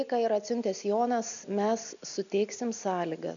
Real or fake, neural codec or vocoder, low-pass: real; none; 7.2 kHz